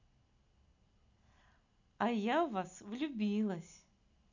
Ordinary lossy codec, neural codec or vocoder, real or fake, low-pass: none; none; real; 7.2 kHz